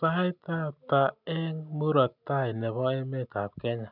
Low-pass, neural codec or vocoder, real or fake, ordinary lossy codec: 5.4 kHz; none; real; none